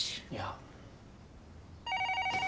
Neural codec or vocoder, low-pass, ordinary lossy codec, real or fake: none; none; none; real